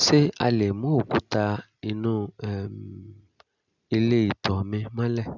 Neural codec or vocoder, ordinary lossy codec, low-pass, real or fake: none; none; 7.2 kHz; real